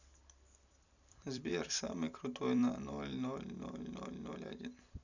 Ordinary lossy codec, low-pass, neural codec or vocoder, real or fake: none; 7.2 kHz; vocoder, 44.1 kHz, 128 mel bands every 256 samples, BigVGAN v2; fake